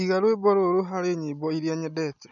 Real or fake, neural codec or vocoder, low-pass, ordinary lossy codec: real; none; 7.2 kHz; none